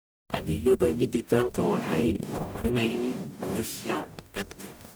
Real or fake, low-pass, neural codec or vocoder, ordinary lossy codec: fake; none; codec, 44.1 kHz, 0.9 kbps, DAC; none